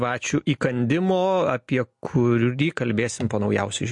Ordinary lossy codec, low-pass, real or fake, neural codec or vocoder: MP3, 48 kbps; 10.8 kHz; real; none